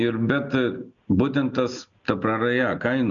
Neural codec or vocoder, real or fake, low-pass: none; real; 7.2 kHz